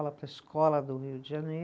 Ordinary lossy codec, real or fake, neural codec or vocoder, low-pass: none; real; none; none